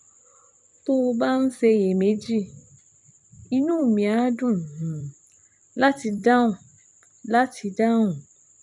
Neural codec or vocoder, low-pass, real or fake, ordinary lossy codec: vocoder, 44.1 kHz, 128 mel bands every 256 samples, BigVGAN v2; 10.8 kHz; fake; none